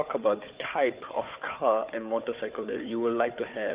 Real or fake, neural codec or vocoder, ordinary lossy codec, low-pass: fake; codec, 16 kHz, 4 kbps, FunCodec, trained on Chinese and English, 50 frames a second; Opus, 64 kbps; 3.6 kHz